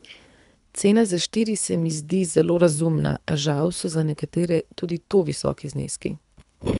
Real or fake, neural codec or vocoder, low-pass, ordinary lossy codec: fake; codec, 24 kHz, 3 kbps, HILCodec; 10.8 kHz; none